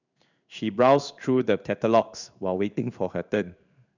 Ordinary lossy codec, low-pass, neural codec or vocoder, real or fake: none; 7.2 kHz; codec, 16 kHz in and 24 kHz out, 1 kbps, XY-Tokenizer; fake